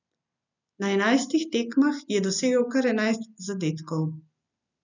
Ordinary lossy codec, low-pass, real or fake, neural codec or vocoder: none; 7.2 kHz; real; none